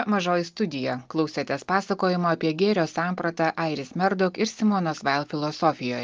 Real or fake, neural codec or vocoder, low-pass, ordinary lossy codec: real; none; 7.2 kHz; Opus, 32 kbps